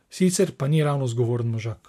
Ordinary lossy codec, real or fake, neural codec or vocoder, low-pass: MP3, 64 kbps; real; none; 14.4 kHz